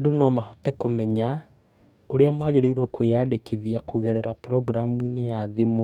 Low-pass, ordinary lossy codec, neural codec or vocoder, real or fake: 19.8 kHz; none; codec, 44.1 kHz, 2.6 kbps, DAC; fake